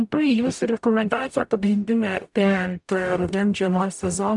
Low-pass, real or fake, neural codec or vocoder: 10.8 kHz; fake; codec, 44.1 kHz, 0.9 kbps, DAC